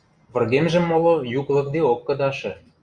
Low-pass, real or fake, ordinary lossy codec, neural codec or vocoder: 9.9 kHz; real; Opus, 64 kbps; none